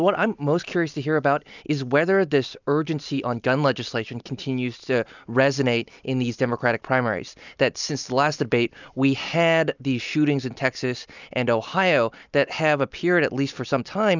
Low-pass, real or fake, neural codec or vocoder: 7.2 kHz; real; none